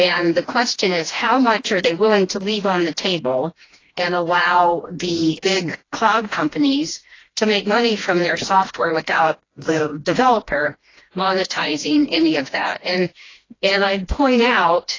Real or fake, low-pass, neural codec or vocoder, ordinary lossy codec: fake; 7.2 kHz; codec, 16 kHz, 1 kbps, FreqCodec, smaller model; AAC, 32 kbps